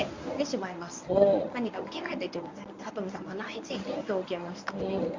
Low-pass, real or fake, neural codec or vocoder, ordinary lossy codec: 7.2 kHz; fake; codec, 24 kHz, 0.9 kbps, WavTokenizer, medium speech release version 1; MP3, 64 kbps